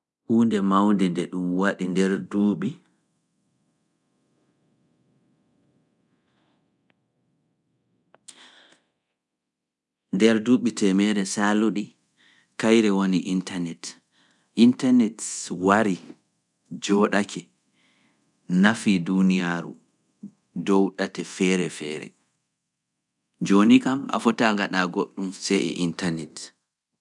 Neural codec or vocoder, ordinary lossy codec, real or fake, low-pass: codec, 24 kHz, 0.9 kbps, DualCodec; none; fake; 10.8 kHz